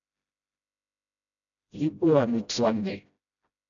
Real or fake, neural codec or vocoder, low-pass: fake; codec, 16 kHz, 0.5 kbps, FreqCodec, smaller model; 7.2 kHz